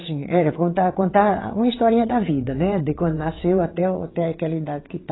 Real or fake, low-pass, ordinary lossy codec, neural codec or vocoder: fake; 7.2 kHz; AAC, 16 kbps; vocoder, 22.05 kHz, 80 mel bands, WaveNeXt